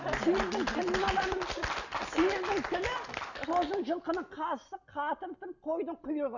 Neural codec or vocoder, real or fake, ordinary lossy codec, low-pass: none; real; none; 7.2 kHz